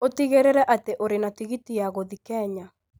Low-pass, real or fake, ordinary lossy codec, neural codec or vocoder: none; real; none; none